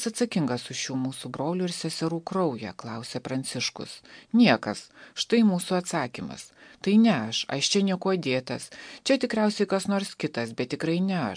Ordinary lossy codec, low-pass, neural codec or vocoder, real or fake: MP3, 64 kbps; 9.9 kHz; none; real